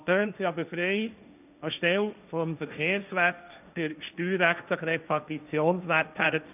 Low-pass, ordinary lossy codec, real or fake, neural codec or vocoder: 3.6 kHz; none; fake; codec, 16 kHz, 1.1 kbps, Voila-Tokenizer